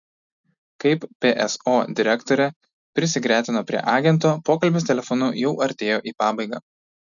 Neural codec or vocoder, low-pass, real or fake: none; 7.2 kHz; real